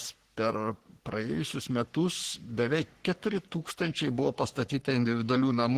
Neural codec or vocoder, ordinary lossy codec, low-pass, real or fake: codec, 44.1 kHz, 3.4 kbps, Pupu-Codec; Opus, 16 kbps; 14.4 kHz; fake